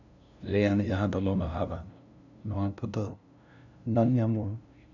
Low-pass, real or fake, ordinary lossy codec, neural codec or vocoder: 7.2 kHz; fake; none; codec, 16 kHz, 0.5 kbps, FunCodec, trained on LibriTTS, 25 frames a second